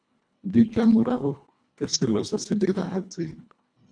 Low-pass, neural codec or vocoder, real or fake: 9.9 kHz; codec, 24 kHz, 1.5 kbps, HILCodec; fake